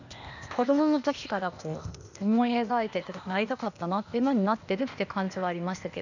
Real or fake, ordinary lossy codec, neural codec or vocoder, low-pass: fake; none; codec, 16 kHz, 0.8 kbps, ZipCodec; 7.2 kHz